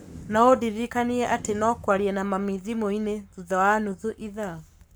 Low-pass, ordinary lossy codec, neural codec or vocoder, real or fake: none; none; codec, 44.1 kHz, 7.8 kbps, Pupu-Codec; fake